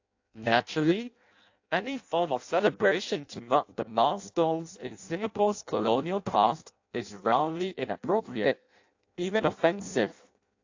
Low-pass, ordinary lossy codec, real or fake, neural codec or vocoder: 7.2 kHz; AAC, 48 kbps; fake; codec, 16 kHz in and 24 kHz out, 0.6 kbps, FireRedTTS-2 codec